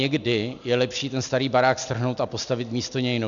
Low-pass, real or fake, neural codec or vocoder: 7.2 kHz; real; none